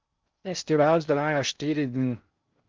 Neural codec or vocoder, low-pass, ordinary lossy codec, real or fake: codec, 16 kHz in and 24 kHz out, 0.6 kbps, FocalCodec, streaming, 2048 codes; 7.2 kHz; Opus, 24 kbps; fake